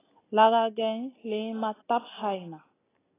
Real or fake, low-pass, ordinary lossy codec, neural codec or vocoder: real; 3.6 kHz; AAC, 16 kbps; none